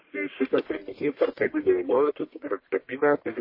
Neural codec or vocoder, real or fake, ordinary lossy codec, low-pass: codec, 44.1 kHz, 1.7 kbps, Pupu-Codec; fake; MP3, 24 kbps; 5.4 kHz